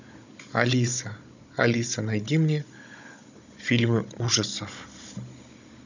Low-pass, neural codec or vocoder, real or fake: 7.2 kHz; codec, 16 kHz, 16 kbps, FunCodec, trained on Chinese and English, 50 frames a second; fake